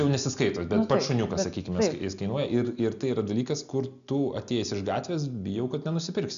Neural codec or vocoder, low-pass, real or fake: none; 7.2 kHz; real